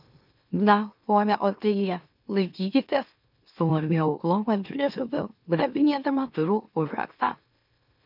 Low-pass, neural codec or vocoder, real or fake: 5.4 kHz; autoencoder, 44.1 kHz, a latent of 192 numbers a frame, MeloTTS; fake